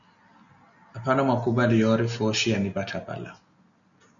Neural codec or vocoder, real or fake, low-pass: none; real; 7.2 kHz